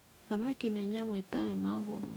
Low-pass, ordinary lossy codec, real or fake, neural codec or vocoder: none; none; fake; codec, 44.1 kHz, 2.6 kbps, DAC